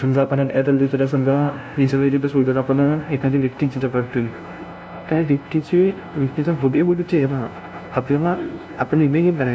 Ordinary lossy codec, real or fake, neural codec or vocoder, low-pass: none; fake; codec, 16 kHz, 0.5 kbps, FunCodec, trained on LibriTTS, 25 frames a second; none